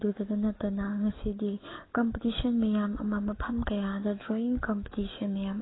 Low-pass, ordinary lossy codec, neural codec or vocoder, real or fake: 7.2 kHz; AAC, 16 kbps; codec, 44.1 kHz, 7.8 kbps, DAC; fake